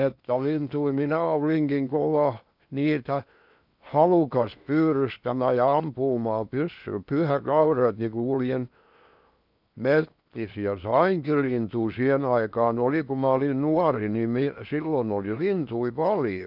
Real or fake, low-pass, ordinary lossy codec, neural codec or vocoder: fake; 5.4 kHz; none; codec, 16 kHz in and 24 kHz out, 0.8 kbps, FocalCodec, streaming, 65536 codes